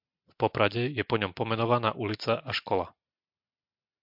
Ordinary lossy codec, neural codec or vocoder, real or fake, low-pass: MP3, 48 kbps; none; real; 5.4 kHz